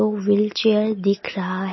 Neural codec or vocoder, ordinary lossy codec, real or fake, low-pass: none; MP3, 24 kbps; real; 7.2 kHz